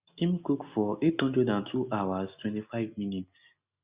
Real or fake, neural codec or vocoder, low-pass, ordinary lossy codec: real; none; 3.6 kHz; Opus, 64 kbps